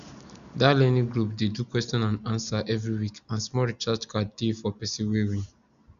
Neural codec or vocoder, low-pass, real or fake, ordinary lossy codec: none; 7.2 kHz; real; none